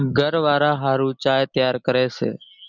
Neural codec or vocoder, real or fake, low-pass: none; real; 7.2 kHz